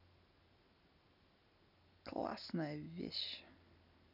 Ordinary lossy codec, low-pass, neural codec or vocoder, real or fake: none; 5.4 kHz; none; real